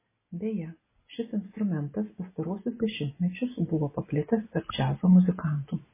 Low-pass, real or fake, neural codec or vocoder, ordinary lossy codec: 3.6 kHz; real; none; MP3, 16 kbps